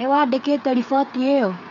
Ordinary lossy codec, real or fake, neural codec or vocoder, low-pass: none; fake; codec, 16 kHz, 8 kbps, FreqCodec, smaller model; 7.2 kHz